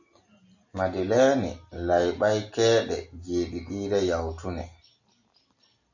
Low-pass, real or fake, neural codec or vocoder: 7.2 kHz; real; none